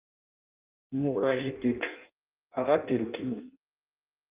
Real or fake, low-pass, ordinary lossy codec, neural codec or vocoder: fake; 3.6 kHz; Opus, 24 kbps; codec, 16 kHz in and 24 kHz out, 0.6 kbps, FireRedTTS-2 codec